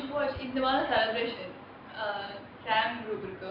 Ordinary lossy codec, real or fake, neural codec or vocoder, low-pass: MP3, 48 kbps; real; none; 5.4 kHz